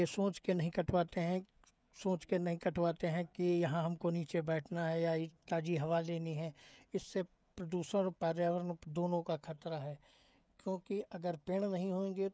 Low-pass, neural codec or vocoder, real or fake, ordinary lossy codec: none; codec, 16 kHz, 16 kbps, FreqCodec, smaller model; fake; none